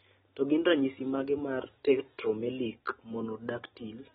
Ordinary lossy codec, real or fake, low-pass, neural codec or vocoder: AAC, 16 kbps; real; 10.8 kHz; none